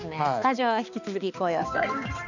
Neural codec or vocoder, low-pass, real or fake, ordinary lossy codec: codec, 16 kHz, 2 kbps, X-Codec, HuBERT features, trained on balanced general audio; 7.2 kHz; fake; none